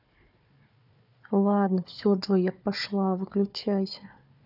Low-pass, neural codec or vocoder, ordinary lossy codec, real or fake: 5.4 kHz; codec, 16 kHz, 4 kbps, FunCodec, trained on Chinese and English, 50 frames a second; none; fake